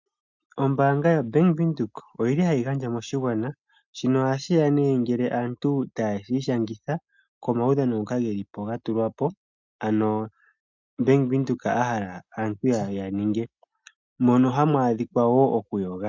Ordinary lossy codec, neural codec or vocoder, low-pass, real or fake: MP3, 64 kbps; none; 7.2 kHz; real